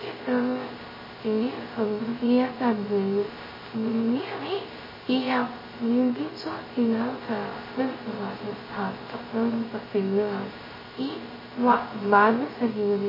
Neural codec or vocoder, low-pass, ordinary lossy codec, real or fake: codec, 16 kHz, 0.2 kbps, FocalCodec; 5.4 kHz; MP3, 24 kbps; fake